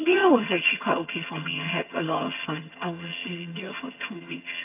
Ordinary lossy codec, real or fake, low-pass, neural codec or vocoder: AAC, 24 kbps; fake; 3.6 kHz; vocoder, 22.05 kHz, 80 mel bands, HiFi-GAN